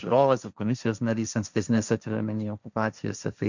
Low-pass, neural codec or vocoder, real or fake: 7.2 kHz; codec, 16 kHz, 1.1 kbps, Voila-Tokenizer; fake